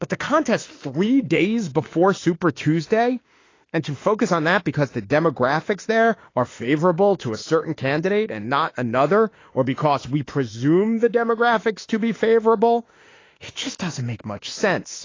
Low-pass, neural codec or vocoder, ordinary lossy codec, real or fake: 7.2 kHz; autoencoder, 48 kHz, 32 numbers a frame, DAC-VAE, trained on Japanese speech; AAC, 32 kbps; fake